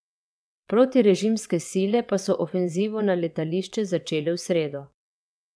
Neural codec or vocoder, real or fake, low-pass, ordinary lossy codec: vocoder, 22.05 kHz, 80 mel bands, WaveNeXt; fake; none; none